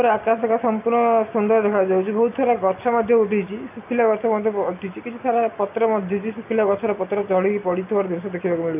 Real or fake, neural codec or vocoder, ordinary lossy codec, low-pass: real; none; none; 3.6 kHz